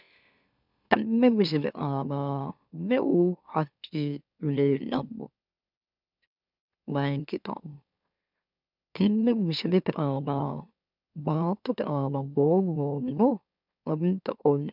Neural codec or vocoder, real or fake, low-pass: autoencoder, 44.1 kHz, a latent of 192 numbers a frame, MeloTTS; fake; 5.4 kHz